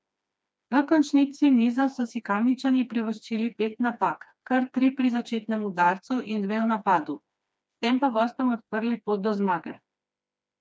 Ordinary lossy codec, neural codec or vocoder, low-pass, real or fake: none; codec, 16 kHz, 2 kbps, FreqCodec, smaller model; none; fake